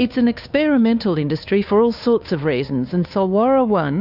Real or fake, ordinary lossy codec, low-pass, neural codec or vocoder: fake; AAC, 48 kbps; 5.4 kHz; codec, 16 kHz, 2 kbps, FunCodec, trained on Chinese and English, 25 frames a second